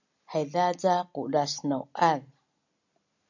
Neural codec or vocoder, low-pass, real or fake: none; 7.2 kHz; real